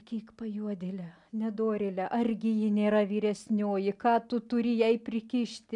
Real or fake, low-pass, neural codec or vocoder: real; 10.8 kHz; none